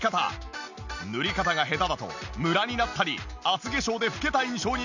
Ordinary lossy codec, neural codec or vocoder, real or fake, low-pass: none; none; real; 7.2 kHz